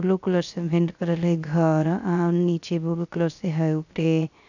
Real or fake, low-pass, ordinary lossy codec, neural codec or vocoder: fake; 7.2 kHz; none; codec, 16 kHz, 0.3 kbps, FocalCodec